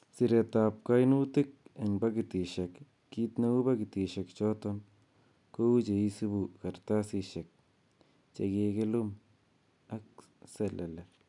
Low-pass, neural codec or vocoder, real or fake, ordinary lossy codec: 10.8 kHz; none; real; none